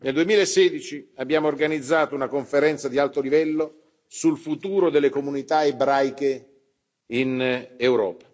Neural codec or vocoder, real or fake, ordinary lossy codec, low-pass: none; real; none; none